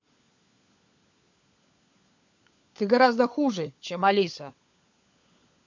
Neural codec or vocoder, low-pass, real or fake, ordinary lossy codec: codec, 16 kHz, 16 kbps, FunCodec, trained on LibriTTS, 50 frames a second; 7.2 kHz; fake; MP3, 48 kbps